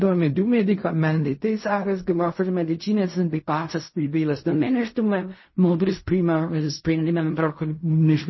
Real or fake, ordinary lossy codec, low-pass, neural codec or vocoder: fake; MP3, 24 kbps; 7.2 kHz; codec, 16 kHz in and 24 kHz out, 0.4 kbps, LongCat-Audio-Codec, fine tuned four codebook decoder